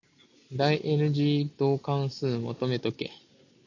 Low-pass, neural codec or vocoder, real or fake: 7.2 kHz; none; real